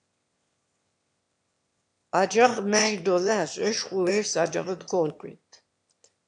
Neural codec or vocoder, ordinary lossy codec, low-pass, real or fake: autoencoder, 22.05 kHz, a latent of 192 numbers a frame, VITS, trained on one speaker; AAC, 64 kbps; 9.9 kHz; fake